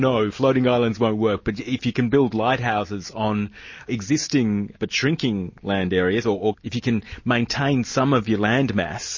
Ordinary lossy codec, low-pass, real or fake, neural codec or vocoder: MP3, 32 kbps; 7.2 kHz; real; none